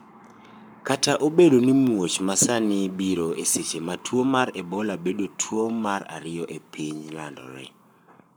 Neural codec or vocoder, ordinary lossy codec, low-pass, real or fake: codec, 44.1 kHz, 7.8 kbps, Pupu-Codec; none; none; fake